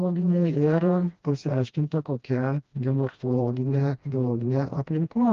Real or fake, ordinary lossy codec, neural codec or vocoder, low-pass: fake; Opus, 32 kbps; codec, 16 kHz, 1 kbps, FreqCodec, smaller model; 7.2 kHz